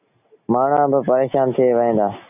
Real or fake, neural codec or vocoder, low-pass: real; none; 3.6 kHz